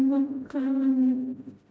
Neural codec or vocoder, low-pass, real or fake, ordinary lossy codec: codec, 16 kHz, 0.5 kbps, FreqCodec, smaller model; none; fake; none